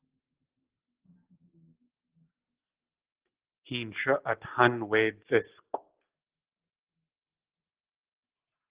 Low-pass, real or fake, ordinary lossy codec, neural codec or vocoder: 3.6 kHz; real; Opus, 16 kbps; none